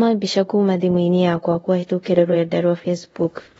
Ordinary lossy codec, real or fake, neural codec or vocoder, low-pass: AAC, 24 kbps; fake; codec, 24 kHz, 0.5 kbps, DualCodec; 10.8 kHz